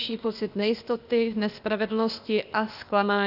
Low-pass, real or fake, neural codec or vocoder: 5.4 kHz; fake; codec, 16 kHz, 0.8 kbps, ZipCodec